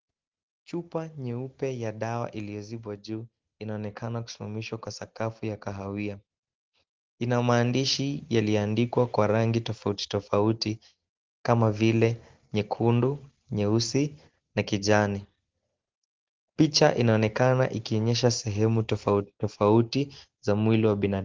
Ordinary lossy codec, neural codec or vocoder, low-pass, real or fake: Opus, 16 kbps; none; 7.2 kHz; real